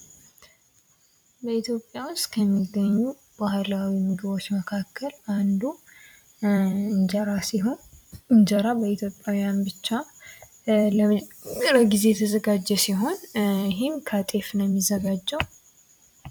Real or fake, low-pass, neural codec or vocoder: fake; 19.8 kHz; vocoder, 44.1 kHz, 128 mel bands every 256 samples, BigVGAN v2